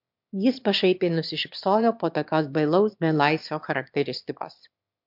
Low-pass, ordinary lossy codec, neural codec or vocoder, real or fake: 5.4 kHz; MP3, 48 kbps; autoencoder, 22.05 kHz, a latent of 192 numbers a frame, VITS, trained on one speaker; fake